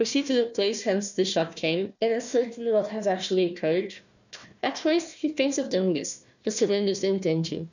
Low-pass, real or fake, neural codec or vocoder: 7.2 kHz; fake; codec, 16 kHz, 1 kbps, FunCodec, trained on Chinese and English, 50 frames a second